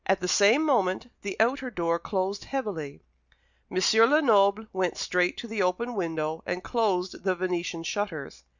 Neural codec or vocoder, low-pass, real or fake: none; 7.2 kHz; real